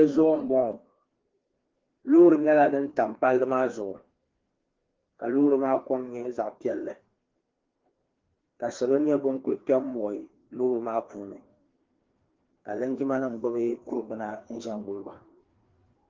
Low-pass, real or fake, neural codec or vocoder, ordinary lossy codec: 7.2 kHz; fake; codec, 16 kHz, 2 kbps, FreqCodec, larger model; Opus, 16 kbps